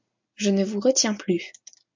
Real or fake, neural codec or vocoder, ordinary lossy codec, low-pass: real; none; MP3, 48 kbps; 7.2 kHz